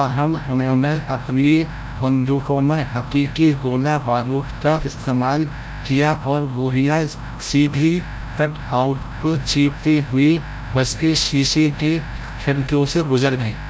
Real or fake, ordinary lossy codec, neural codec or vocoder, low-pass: fake; none; codec, 16 kHz, 0.5 kbps, FreqCodec, larger model; none